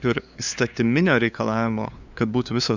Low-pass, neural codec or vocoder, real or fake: 7.2 kHz; codec, 16 kHz, 2 kbps, FunCodec, trained on LibriTTS, 25 frames a second; fake